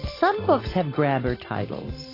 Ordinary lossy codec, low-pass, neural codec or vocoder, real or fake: AAC, 24 kbps; 5.4 kHz; none; real